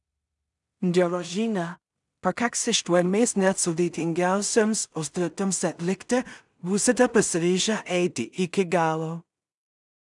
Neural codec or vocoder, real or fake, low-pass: codec, 16 kHz in and 24 kHz out, 0.4 kbps, LongCat-Audio-Codec, two codebook decoder; fake; 10.8 kHz